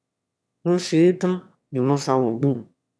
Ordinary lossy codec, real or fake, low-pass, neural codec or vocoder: none; fake; none; autoencoder, 22.05 kHz, a latent of 192 numbers a frame, VITS, trained on one speaker